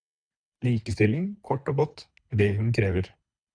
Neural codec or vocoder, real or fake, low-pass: codec, 24 kHz, 3 kbps, HILCodec; fake; 9.9 kHz